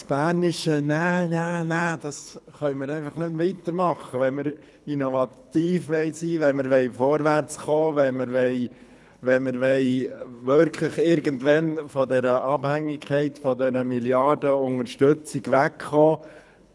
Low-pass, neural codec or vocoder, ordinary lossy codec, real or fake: none; codec, 24 kHz, 3 kbps, HILCodec; none; fake